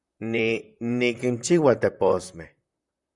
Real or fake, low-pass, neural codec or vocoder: fake; 10.8 kHz; vocoder, 44.1 kHz, 128 mel bands, Pupu-Vocoder